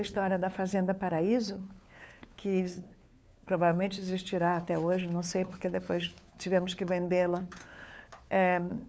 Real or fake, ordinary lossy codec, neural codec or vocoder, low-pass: fake; none; codec, 16 kHz, 4 kbps, FunCodec, trained on LibriTTS, 50 frames a second; none